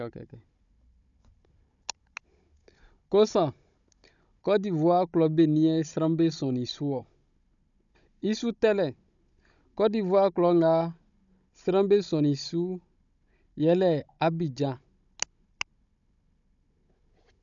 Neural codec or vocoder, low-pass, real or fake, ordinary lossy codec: codec, 16 kHz, 16 kbps, FunCodec, trained on Chinese and English, 50 frames a second; 7.2 kHz; fake; MP3, 96 kbps